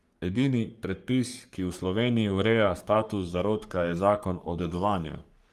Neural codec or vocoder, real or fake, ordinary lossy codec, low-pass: codec, 44.1 kHz, 3.4 kbps, Pupu-Codec; fake; Opus, 32 kbps; 14.4 kHz